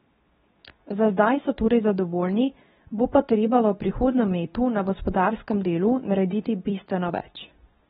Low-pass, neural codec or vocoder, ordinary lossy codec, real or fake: 10.8 kHz; codec, 24 kHz, 0.9 kbps, WavTokenizer, medium speech release version 2; AAC, 16 kbps; fake